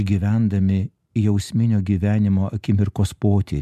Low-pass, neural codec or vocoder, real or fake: 14.4 kHz; none; real